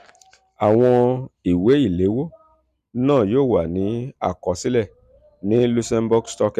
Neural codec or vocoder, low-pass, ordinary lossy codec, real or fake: none; 14.4 kHz; none; real